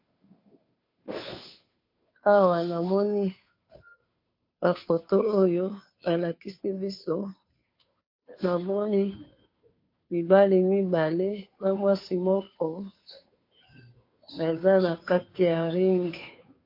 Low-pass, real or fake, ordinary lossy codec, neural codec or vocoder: 5.4 kHz; fake; MP3, 32 kbps; codec, 16 kHz, 2 kbps, FunCodec, trained on Chinese and English, 25 frames a second